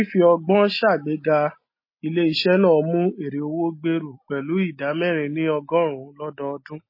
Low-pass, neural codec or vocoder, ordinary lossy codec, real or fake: 5.4 kHz; none; MP3, 24 kbps; real